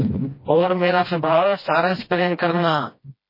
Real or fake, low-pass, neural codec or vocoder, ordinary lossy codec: fake; 5.4 kHz; codec, 16 kHz, 1 kbps, FreqCodec, smaller model; MP3, 24 kbps